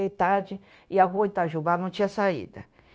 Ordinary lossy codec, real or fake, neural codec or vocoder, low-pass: none; fake; codec, 16 kHz, 0.9 kbps, LongCat-Audio-Codec; none